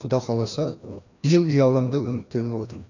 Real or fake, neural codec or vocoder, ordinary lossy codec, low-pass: fake; codec, 16 kHz, 1 kbps, FreqCodec, larger model; none; 7.2 kHz